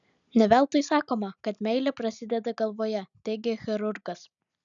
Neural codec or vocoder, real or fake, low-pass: none; real; 7.2 kHz